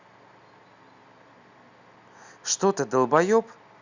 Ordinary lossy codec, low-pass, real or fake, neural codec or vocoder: Opus, 64 kbps; 7.2 kHz; real; none